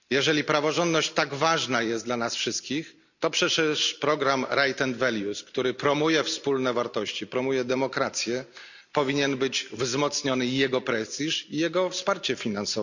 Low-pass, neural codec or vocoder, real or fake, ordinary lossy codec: 7.2 kHz; none; real; none